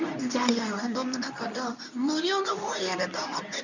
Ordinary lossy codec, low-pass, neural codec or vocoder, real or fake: none; 7.2 kHz; codec, 24 kHz, 0.9 kbps, WavTokenizer, medium speech release version 2; fake